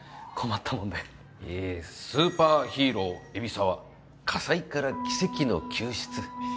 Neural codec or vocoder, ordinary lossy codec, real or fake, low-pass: none; none; real; none